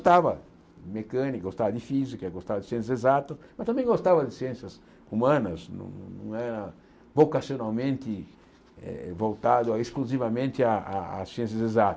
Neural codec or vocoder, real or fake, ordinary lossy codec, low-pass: none; real; none; none